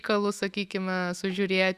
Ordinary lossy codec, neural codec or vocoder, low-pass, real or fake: Opus, 64 kbps; autoencoder, 48 kHz, 128 numbers a frame, DAC-VAE, trained on Japanese speech; 14.4 kHz; fake